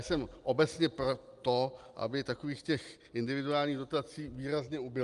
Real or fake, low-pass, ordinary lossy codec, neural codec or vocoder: real; 10.8 kHz; Opus, 32 kbps; none